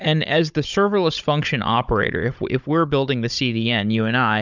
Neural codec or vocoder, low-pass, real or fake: codec, 16 kHz, 16 kbps, FunCodec, trained on Chinese and English, 50 frames a second; 7.2 kHz; fake